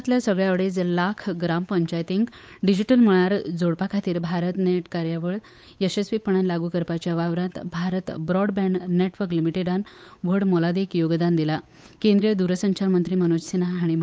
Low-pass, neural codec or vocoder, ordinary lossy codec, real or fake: none; codec, 16 kHz, 8 kbps, FunCodec, trained on Chinese and English, 25 frames a second; none; fake